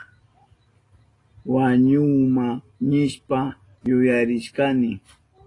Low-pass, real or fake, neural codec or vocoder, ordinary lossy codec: 10.8 kHz; real; none; AAC, 32 kbps